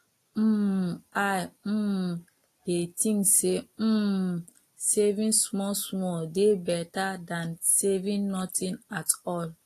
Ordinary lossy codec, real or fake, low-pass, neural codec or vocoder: AAC, 48 kbps; real; 14.4 kHz; none